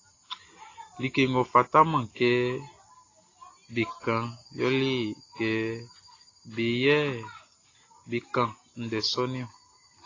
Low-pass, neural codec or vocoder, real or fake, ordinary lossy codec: 7.2 kHz; none; real; AAC, 32 kbps